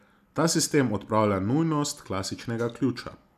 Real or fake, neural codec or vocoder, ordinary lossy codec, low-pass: real; none; none; 14.4 kHz